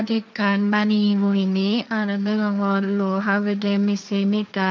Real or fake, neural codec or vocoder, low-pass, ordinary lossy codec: fake; codec, 16 kHz, 1.1 kbps, Voila-Tokenizer; none; none